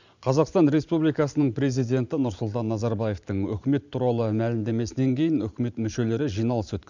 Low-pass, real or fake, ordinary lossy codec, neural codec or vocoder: 7.2 kHz; fake; none; vocoder, 44.1 kHz, 128 mel bands every 512 samples, BigVGAN v2